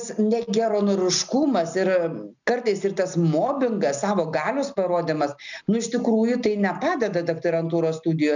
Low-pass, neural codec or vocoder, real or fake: 7.2 kHz; none; real